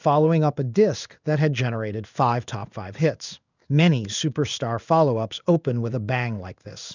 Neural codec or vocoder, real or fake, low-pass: codec, 16 kHz in and 24 kHz out, 1 kbps, XY-Tokenizer; fake; 7.2 kHz